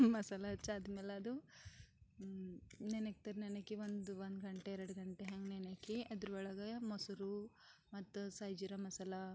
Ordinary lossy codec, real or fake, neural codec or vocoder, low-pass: none; real; none; none